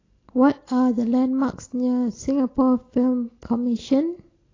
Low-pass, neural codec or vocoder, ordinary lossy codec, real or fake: 7.2 kHz; none; AAC, 32 kbps; real